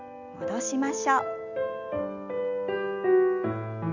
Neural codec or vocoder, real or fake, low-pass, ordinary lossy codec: none; real; 7.2 kHz; none